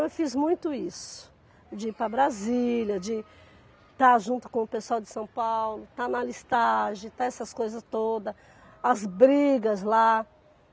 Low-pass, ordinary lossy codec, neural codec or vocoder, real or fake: none; none; none; real